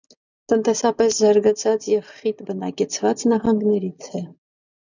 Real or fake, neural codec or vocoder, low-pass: real; none; 7.2 kHz